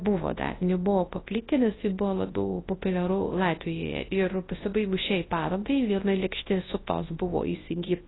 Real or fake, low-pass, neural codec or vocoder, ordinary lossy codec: fake; 7.2 kHz; codec, 24 kHz, 0.9 kbps, WavTokenizer, large speech release; AAC, 16 kbps